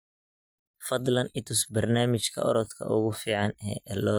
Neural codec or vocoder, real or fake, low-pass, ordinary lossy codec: none; real; none; none